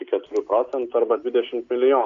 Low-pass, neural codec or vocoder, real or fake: 7.2 kHz; none; real